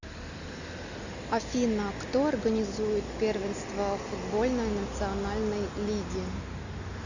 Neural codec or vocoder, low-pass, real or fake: none; 7.2 kHz; real